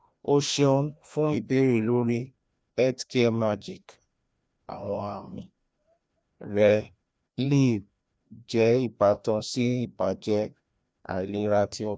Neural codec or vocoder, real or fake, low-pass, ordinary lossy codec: codec, 16 kHz, 1 kbps, FreqCodec, larger model; fake; none; none